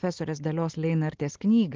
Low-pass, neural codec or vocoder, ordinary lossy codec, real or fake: 7.2 kHz; none; Opus, 16 kbps; real